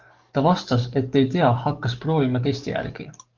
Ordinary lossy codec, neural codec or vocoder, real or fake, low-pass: Opus, 32 kbps; codec, 44.1 kHz, 7.8 kbps, DAC; fake; 7.2 kHz